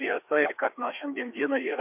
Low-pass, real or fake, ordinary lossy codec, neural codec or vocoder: 3.6 kHz; fake; MP3, 32 kbps; codec, 16 kHz, 2 kbps, FreqCodec, larger model